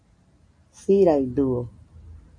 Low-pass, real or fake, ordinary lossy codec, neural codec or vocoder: 9.9 kHz; real; MP3, 48 kbps; none